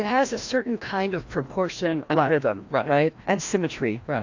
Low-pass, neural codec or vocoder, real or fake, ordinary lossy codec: 7.2 kHz; codec, 16 kHz, 1 kbps, FreqCodec, larger model; fake; AAC, 48 kbps